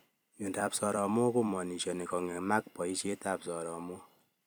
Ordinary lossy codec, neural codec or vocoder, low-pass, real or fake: none; vocoder, 44.1 kHz, 128 mel bands every 256 samples, BigVGAN v2; none; fake